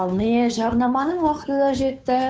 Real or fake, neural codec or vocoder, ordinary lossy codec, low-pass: fake; codec, 16 kHz, 2 kbps, FunCodec, trained on Chinese and English, 25 frames a second; none; none